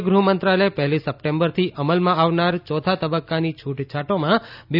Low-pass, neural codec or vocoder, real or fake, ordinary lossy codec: 5.4 kHz; none; real; none